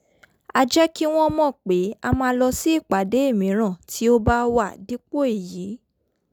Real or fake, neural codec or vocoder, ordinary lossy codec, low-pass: real; none; none; none